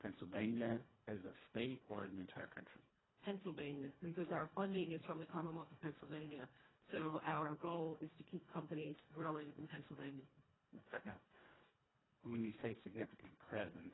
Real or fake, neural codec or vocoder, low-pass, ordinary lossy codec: fake; codec, 24 kHz, 1.5 kbps, HILCodec; 7.2 kHz; AAC, 16 kbps